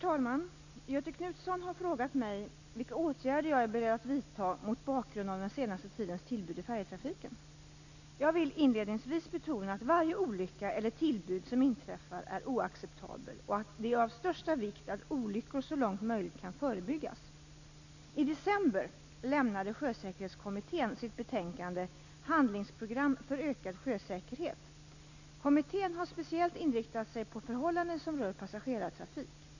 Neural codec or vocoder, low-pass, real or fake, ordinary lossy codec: none; 7.2 kHz; real; none